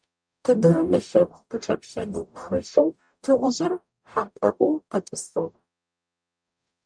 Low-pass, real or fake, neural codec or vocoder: 9.9 kHz; fake; codec, 44.1 kHz, 0.9 kbps, DAC